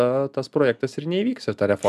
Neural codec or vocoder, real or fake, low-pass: none; real; 14.4 kHz